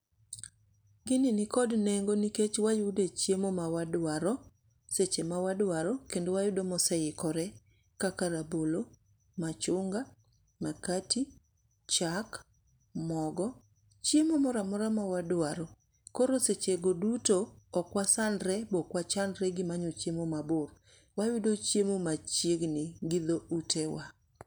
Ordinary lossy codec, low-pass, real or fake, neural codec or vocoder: none; none; real; none